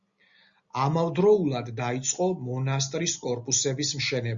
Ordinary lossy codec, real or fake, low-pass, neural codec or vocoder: Opus, 64 kbps; real; 7.2 kHz; none